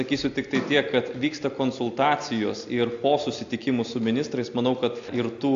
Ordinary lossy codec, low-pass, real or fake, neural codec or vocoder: AAC, 48 kbps; 7.2 kHz; real; none